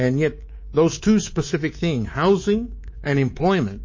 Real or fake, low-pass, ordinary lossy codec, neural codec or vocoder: fake; 7.2 kHz; MP3, 32 kbps; codec, 24 kHz, 3.1 kbps, DualCodec